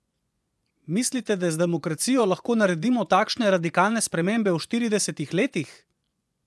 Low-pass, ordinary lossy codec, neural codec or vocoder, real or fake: none; none; vocoder, 24 kHz, 100 mel bands, Vocos; fake